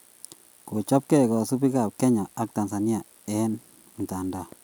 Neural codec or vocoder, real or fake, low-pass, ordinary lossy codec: none; real; none; none